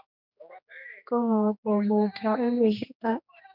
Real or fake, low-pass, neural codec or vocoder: fake; 5.4 kHz; codec, 16 kHz, 2 kbps, X-Codec, HuBERT features, trained on general audio